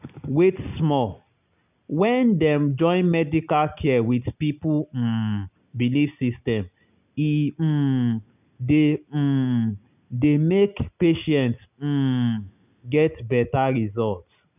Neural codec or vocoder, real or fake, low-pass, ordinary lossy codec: none; real; 3.6 kHz; none